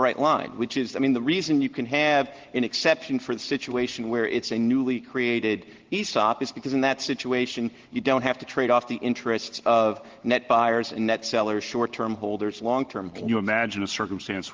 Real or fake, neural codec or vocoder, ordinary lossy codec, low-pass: real; none; Opus, 16 kbps; 7.2 kHz